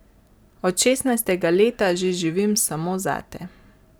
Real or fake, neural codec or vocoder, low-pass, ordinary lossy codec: fake; vocoder, 44.1 kHz, 128 mel bands every 512 samples, BigVGAN v2; none; none